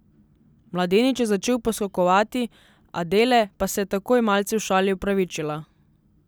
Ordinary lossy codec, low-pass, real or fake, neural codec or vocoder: none; none; real; none